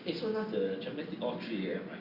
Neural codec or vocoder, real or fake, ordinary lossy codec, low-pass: none; real; Opus, 64 kbps; 5.4 kHz